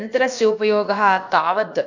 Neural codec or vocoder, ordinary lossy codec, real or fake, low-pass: codec, 16 kHz, about 1 kbps, DyCAST, with the encoder's durations; none; fake; 7.2 kHz